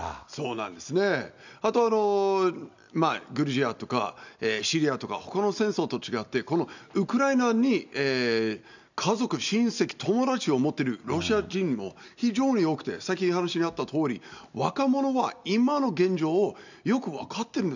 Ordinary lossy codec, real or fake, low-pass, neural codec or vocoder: none; real; 7.2 kHz; none